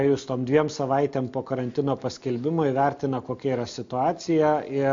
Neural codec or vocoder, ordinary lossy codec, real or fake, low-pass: none; MP3, 48 kbps; real; 7.2 kHz